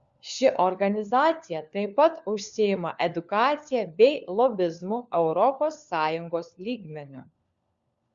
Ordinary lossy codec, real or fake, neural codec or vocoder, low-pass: Opus, 64 kbps; fake; codec, 16 kHz, 4 kbps, FunCodec, trained on LibriTTS, 50 frames a second; 7.2 kHz